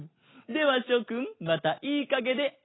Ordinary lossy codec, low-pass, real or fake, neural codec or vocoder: AAC, 16 kbps; 7.2 kHz; real; none